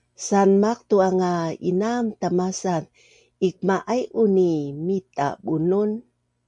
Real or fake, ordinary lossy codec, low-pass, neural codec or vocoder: real; MP3, 64 kbps; 10.8 kHz; none